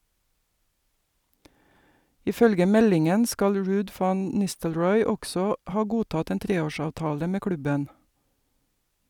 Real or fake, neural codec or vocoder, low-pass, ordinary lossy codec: fake; vocoder, 44.1 kHz, 128 mel bands every 256 samples, BigVGAN v2; 19.8 kHz; none